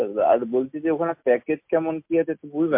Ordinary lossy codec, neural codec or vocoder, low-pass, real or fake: MP3, 32 kbps; none; 3.6 kHz; real